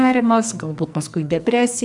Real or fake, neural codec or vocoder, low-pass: fake; codec, 44.1 kHz, 2.6 kbps, SNAC; 10.8 kHz